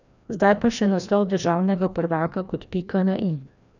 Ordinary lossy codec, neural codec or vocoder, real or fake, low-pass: none; codec, 16 kHz, 1 kbps, FreqCodec, larger model; fake; 7.2 kHz